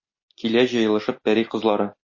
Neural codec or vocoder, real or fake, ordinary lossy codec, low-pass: none; real; MP3, 32 kbps; 7.2 kHz